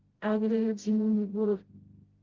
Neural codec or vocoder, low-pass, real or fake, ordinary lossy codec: codec, 16 kHz, 0.5 kbps, FreqCodec, smaller model; 7.2 kHz; fake; Opus, 16 kbps